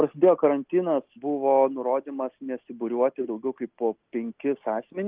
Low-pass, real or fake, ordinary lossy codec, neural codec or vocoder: 3.6 kHz; real; Opus, 32 kbps; none